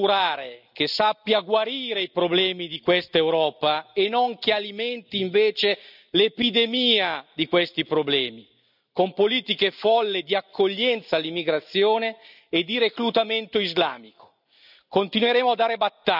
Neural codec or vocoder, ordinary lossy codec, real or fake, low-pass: none; none; real; 5.4 kHz